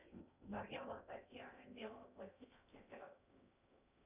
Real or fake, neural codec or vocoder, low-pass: fake; codec, 16 kHz in and 24 kHz out, 0.6 kbps, FocalCodec, streaming, 2048 codes; 3.6 kHz